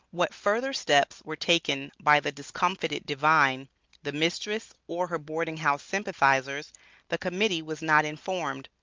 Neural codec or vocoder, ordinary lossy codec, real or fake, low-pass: none; Opus, 24 kbps; real; 7.2 kHz